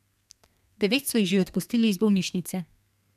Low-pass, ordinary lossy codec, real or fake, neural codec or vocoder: 14.4 kHz; none; fake; codec, 32 kHz, 1.9 kbps, SNAC